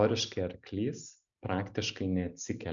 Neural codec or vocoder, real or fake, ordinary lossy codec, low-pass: none; real; MP3, 96 kbps; 7.2 kHz